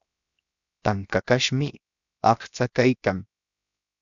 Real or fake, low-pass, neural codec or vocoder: fake; 7.2 kHz; codec, 16 kHz, 0.7 kbps, FocalCodec